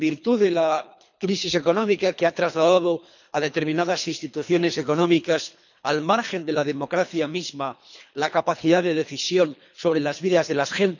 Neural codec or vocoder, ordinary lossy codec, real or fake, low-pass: codec, 24 kHz, 3 kbps, HILCodec; none; fake; 7.2 kHz